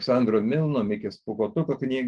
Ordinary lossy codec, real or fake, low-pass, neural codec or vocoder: Opus, 24 kbps; real; 10.8 kHz; none